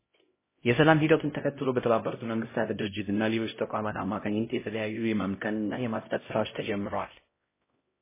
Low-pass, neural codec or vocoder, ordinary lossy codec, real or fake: 3.6 kHz; codec, 16 kHz, 0.5 kbps, X-Codec, HuBERT features, trained on LibriSpeech; MP3, 16 kbps; fake